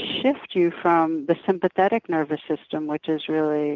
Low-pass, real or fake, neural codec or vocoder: 7.2 kHz; real; none